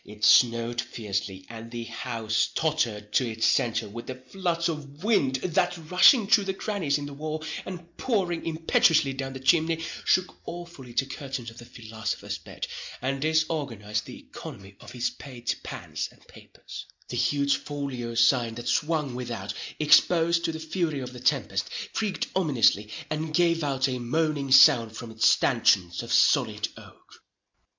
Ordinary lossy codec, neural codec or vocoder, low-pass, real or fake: MP3, 64 kbps; none; 7.2 kHz; real